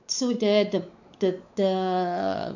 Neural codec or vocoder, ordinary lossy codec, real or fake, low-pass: codec, 16 kHz, 4 kbps, X-Codec, WavLM features, trained on Multilingual LibriSpeech; none; fake; 7.2 kHz